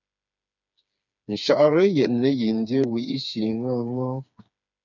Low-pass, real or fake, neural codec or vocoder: 7.2 kHz; fake; codec, 16 kHz, 4 kbps, FreqCodec, smaller model